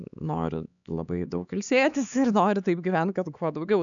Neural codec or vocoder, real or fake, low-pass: codec, 16 kHz, 4 kbps, X-Codec, HuBERT features, trained on LibriSpeech; fake; 7.2 kHz